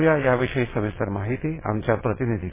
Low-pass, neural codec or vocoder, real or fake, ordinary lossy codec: 3.6 kHz; vocoder, 22.05 kHz, 80 mel bands, WaveNeXt; fake; MP3, 16 kbps